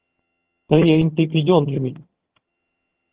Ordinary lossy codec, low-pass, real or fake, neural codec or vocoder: Opus, 16 kbps; 3.6 kHz; fake; vocoder, 22.05 kHz, 80 mel bands, HiFi-GAN